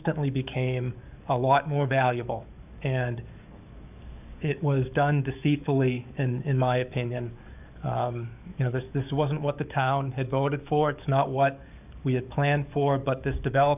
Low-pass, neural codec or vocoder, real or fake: 3.6 kHz; codec, 44.1 kHz, 7.8 kbps, DAC; fake